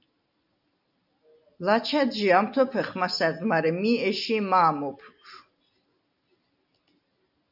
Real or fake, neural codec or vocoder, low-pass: real; none; 5.4 kHz